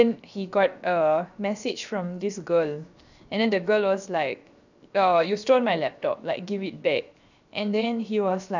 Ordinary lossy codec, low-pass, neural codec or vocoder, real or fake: none; 7.2 kHz; codec, 16 kHz, 0.7 kbps, FocalCodec; fake